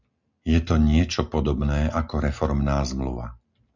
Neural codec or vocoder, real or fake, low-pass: none; real; 7.2 kHz